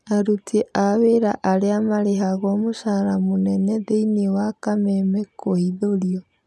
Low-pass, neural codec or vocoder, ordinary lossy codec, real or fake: none; none; none; real